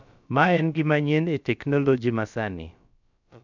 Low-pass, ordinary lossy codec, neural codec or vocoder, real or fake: 7.2 kHz; none; codec, 16 kHz, about 1 kbps, DyCAST, with the encoder's durations; fake